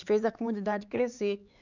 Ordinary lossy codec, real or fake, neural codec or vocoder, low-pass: none; fake; codec, 16 kHz, 4 kbps, X-Codec, HuBERT features, trained on LibriSpeech; 7.2 kHz